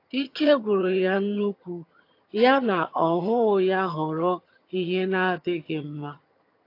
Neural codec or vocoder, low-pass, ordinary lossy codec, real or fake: vocoder, 22.05 kHz, 80 mel bands, HiFi-GAN; 5.4 kHz; AAC, 32 kbps; fake